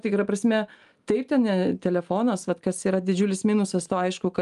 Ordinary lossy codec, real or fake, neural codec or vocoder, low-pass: Opus, 32 kbps; real; none; 10.8 kHz